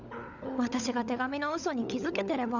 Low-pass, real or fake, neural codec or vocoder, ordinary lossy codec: 7.2 kHz; fake; codec, 16 kHz, 16 kbps, FunCodec, trained on LibriTTS, 50 frames a second; none